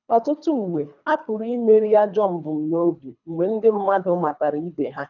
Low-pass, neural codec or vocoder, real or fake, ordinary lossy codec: 7.2 kHz; codec, 24 kHz, 3 kbps, HILCodec; fake; none